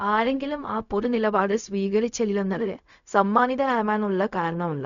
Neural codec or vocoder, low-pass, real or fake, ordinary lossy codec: codec, 16 kHz, 0.4 kbps, LongCat-Audio-Codec; 7.2 kHz; fake; none